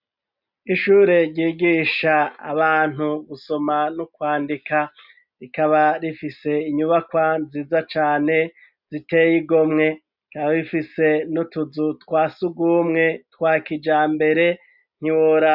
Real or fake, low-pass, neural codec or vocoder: real; 5.4 kHz; none